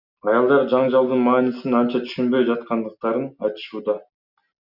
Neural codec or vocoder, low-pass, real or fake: none; 5.4 kHz; real